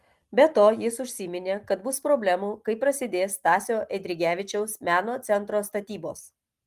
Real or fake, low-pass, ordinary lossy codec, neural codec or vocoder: real; 14.4 kHz; Opus, 24 kbps; none